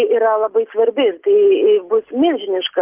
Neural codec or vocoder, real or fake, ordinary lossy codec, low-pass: none; real; Opus, 32 kbps; 3.6 kHz